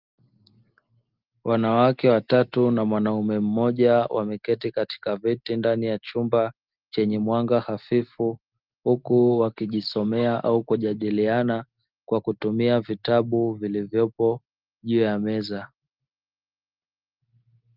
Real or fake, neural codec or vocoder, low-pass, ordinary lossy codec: real; none; 5.4 kHz; Opus, 32 kbps